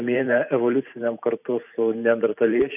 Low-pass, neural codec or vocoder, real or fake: 3.6 kHz; vocoder, 44.1 kHz, 128 mel bands every 512 samples, BigVGAN v2; fake